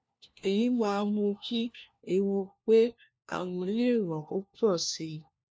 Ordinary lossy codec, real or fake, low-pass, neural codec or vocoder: none; fake; none; codec, 16 kHz, 1 kbps, FunCodec, trained on LibriTTS, 50 frames a second